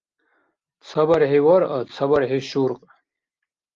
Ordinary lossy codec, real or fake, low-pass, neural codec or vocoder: Opus, 32 kbps; real; 7.2 kHz; none